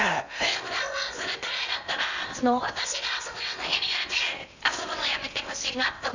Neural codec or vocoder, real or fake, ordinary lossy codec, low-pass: codec, 16 kHz in and 24 kHz out, 0.8 kbps, FocalCodec, streaming, 65536 codes; fake; none; 7.2 kHz